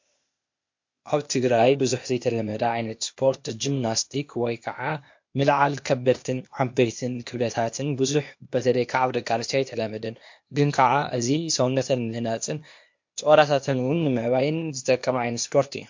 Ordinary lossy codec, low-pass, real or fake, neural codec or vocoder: MP3, 48 kbps; 7.2 kHz; fake; codec, 16 kHz, 0.8 kbps, ZipCodec